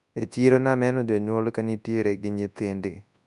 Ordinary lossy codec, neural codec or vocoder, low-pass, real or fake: none; codec, 24 kHz, 0.9 kbps, WavTokenizer, large speech release; 10.8 kHz; fake